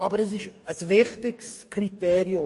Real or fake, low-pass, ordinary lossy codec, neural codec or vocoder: fake; 14.4 kHz; MP3, 48 kbps; codec, 44.1 kHz, 2.6 kbps, DAC